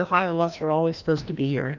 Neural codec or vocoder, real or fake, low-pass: codec, 16 kHz, 1 kbps, FreqCodec, larger model; fake; 7.2 kHz